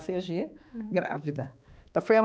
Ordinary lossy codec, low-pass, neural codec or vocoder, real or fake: none; none; codec, 16 kHz, 2 kbps, X-Codec, HuBERT features, trained on balanced general audio; fake